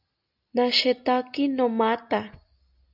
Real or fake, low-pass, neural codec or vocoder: real; 5.4 kHz; none